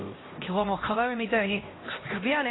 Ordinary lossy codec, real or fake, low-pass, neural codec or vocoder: AAC, 16 kbps; fake; 7.2 kHz; codec, 16 kHz, 1 kbps, X-Codec, HuBERT features, trained on LibriSpeech